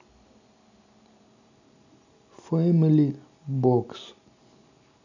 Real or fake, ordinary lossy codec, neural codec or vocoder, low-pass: real; none; none; 7.2 kHz